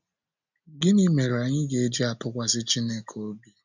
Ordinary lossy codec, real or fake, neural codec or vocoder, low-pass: none; real; none; 7.2 kHz